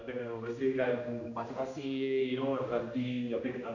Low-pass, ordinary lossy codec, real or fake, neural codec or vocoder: 7.2 kHz; none; fake; codec, 16 kHz, 1 kbps, X-Codec, HuBERT features, trained on general audio